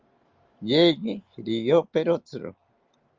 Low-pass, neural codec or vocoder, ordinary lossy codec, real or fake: 7.2 kHz; none; Opus, 32 kbps; real